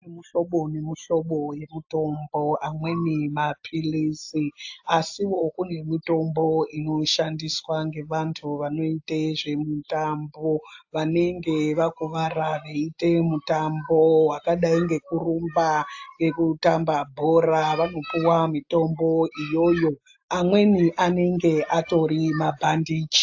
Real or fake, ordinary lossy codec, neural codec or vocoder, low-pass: real; AAC, 48 kbps; none; 7.2 kHz